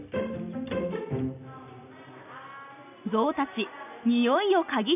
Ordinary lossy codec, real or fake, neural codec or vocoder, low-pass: none; real; none; 3.6 kHz